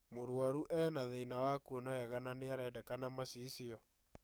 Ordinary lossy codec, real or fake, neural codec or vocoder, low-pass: none; fake; codec, 44.1 kHz, 7.8 kbps, DAC; none